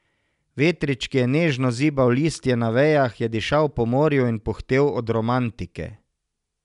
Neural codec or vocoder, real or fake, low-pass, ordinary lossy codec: none; real; 10.8 kHz; none